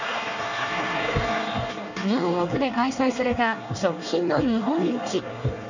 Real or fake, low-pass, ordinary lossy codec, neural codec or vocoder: fake; 7.2 kHz; none; codec, 24 kHz, 1 kbps, SNAC